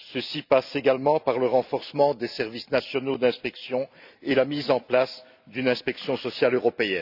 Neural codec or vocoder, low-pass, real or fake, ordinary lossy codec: none; 5.4 kHz; real; none